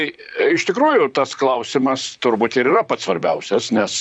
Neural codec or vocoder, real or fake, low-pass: vocoder, 44.1 kHz, 128 mel bands, Pupu-Vocoder; fake; 9.9 kHz